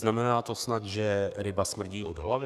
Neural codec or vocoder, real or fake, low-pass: codec, 32 kHz, 1.9 kbps, SNAC; fake; 14.4 kHz